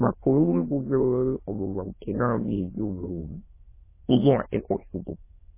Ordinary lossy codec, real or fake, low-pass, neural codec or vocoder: MP3, 16 kbps; fake; 3.6 kHz; autoencoder, 22.05 kHz, a latent of 192 numbers a frame, VITS, trained on many speakers